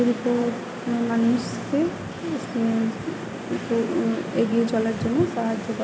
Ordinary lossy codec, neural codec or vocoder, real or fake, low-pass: none; none; real; none